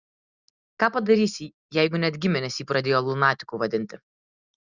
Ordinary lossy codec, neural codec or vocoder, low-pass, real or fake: Opus, 64 kbps; none; 7.2 kHz; real